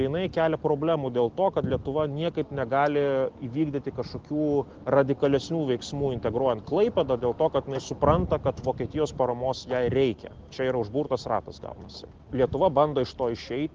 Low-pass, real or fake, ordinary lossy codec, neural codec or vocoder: 7.2 kHz; real; Opus, 16 kbps; none